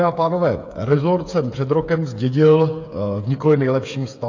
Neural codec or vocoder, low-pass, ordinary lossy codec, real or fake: codec, 16 kHz, 8 kbps, FreqCodec, smaller model; 7.2 kHz; AAC, 48 kbps; fake